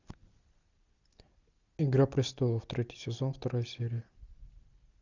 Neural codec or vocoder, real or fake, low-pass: none; real; 7.2 kHz